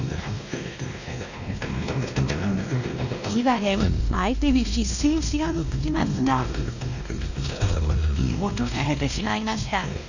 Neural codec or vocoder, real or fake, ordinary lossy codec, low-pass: codec, 16 kHz, 1 kbps, X-Codec, WavLM features, trained on Multilingual LibriSpeech; fake; none; 7.2 kHz